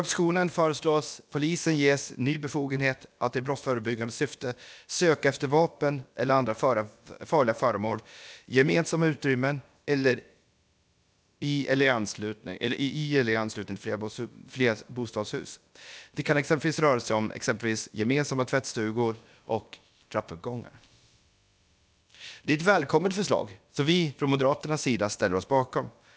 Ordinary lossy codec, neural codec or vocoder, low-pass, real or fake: none; codec, 16 kHz, about 1 kbps, DyCAST, with the encoder's durations; none; fake